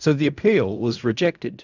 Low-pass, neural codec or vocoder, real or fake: 7.2 kHz; codec, 16 kHz in and 24 kHz out, 0.4 kbps, LongCat-Audio-Codec, fine tuned four codebook decoder; fake